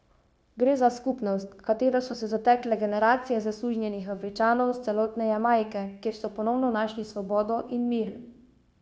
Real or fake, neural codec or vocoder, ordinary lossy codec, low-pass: fake; codec, 16 kHz, 0.9 kbps, LongCat-Audio-Codec; none; none